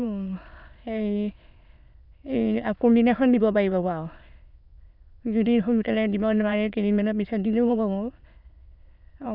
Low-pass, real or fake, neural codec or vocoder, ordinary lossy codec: 5.4 kHz; fake; autoencoder, 22.05 kHz, a latent of 192 numbers a frame, VITS, trained on many speakers; none